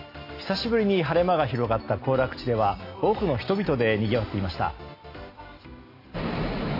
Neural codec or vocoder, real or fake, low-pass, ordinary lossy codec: none; real; 5.4 kHz; AAC, 32 kbps